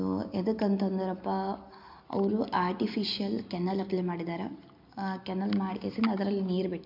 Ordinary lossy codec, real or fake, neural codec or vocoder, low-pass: MP3, 48 kbps; real; none; 5.4 kHz